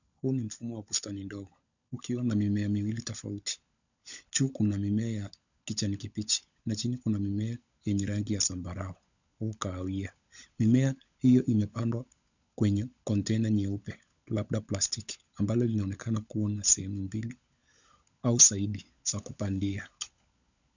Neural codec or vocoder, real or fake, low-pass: codec, 16 kHz, 16 kbps, FunCodec, trained on LibriTTS, 50 frames a second; fake; 7.2 kHz